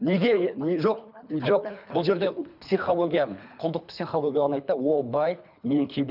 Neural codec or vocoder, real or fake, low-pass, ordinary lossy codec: codec, 24 kHz, 3 kbps, HILCodec; fake; 5.4 kHz; none